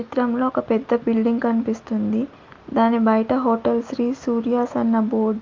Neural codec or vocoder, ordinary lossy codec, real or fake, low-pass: none; Opus, 24 kbps; real; 7.2 kHz